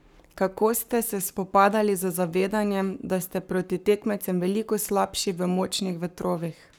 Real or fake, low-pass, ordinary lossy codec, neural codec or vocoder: fake; none; none; codec, 44.1 kHz, 7.8 kbps, Pupu-Codec